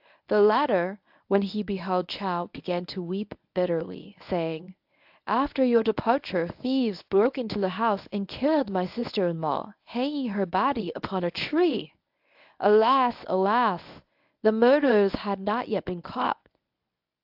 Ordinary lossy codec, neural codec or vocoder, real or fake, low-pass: AAC, 48 kbps; codec, 24 kHz, 0.9 kbps, WavTokenizer, medium speech release version 1; fake; 5.4 kHz